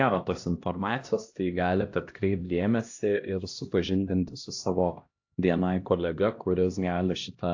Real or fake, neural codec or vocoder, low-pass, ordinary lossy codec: fake; codec, 16 kHz, 1 kbps, X-Codec, HuBERT features, trained on LibriSpeech; 7.2 kHz; AAC, 48 kbps